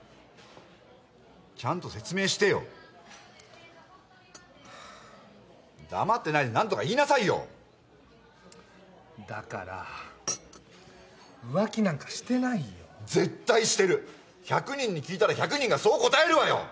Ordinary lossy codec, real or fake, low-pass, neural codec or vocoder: none; real; none; none